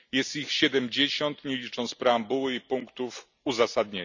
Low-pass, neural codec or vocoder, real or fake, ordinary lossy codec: 7.2 kHz; none; real; none